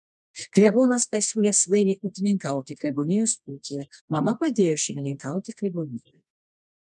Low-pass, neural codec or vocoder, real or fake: 10.8 kHz; codec, 24 kHz, 0.9 kbps, WavTokenizer, medium music audio release; fake